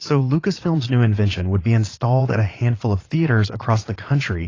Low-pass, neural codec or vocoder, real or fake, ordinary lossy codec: 7.2 kHz; vocoder, 22.05 kHz, 80 mel bands, Vocos; fake; AAC, 32 kbps